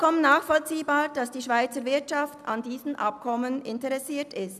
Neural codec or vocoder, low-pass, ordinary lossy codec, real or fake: none; 14.4 kHz; none; real